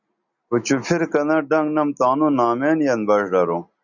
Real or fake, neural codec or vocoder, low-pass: real; none; 7.2 kHz